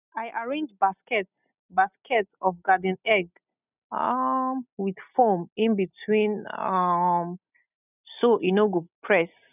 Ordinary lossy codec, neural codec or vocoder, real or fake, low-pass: none; none; real; 3.6 kHz